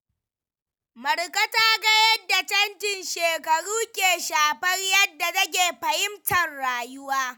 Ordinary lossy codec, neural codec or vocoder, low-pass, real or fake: none; none; none; real